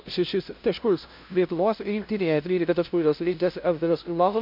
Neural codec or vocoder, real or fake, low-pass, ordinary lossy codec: codec, 16 kHz, 0.5 kbps, FunCodec, trained on LibriTTS, 25 frames a second; fake; 5.4 kHz; MP3, 48 kbps